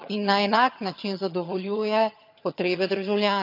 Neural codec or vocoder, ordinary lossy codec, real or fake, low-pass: vocoder, 22.05 kHz, 80 mel bands, HiFi-GAN; none; fake; 5.4 kHz